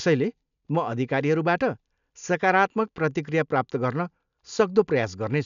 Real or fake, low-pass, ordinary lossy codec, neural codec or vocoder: real; 7.2 kHz; none; none